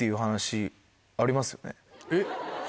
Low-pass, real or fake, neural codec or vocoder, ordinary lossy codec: none; real; none; none